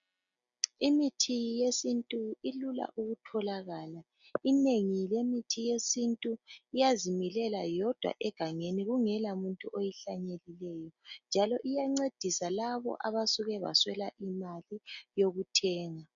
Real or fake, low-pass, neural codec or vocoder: real; 7.2 kHz; none